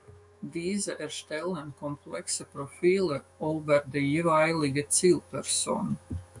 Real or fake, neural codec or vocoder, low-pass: fake; autoencoder, 48 kHz, 128 numbers a frame, DAC-VAE, trained on Japanese speech; 10.8 kHz